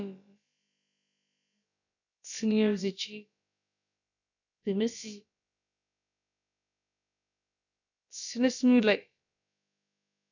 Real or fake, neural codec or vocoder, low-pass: fake; codec, 16 kHz, about 1 kbps, DyCAST, with the encoder's durations; 7.2 kHz